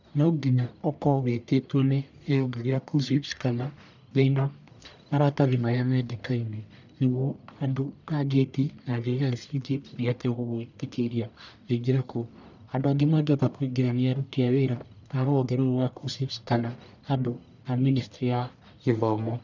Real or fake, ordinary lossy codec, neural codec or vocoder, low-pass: fake; none; codec, 44.1 kHz, 1.7 kbps, Pupu-Codec; 7.2 kHz